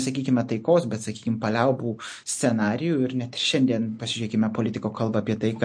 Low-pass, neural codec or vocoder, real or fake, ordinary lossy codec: 9.9 kHz; none; real; MP3, 48 kbps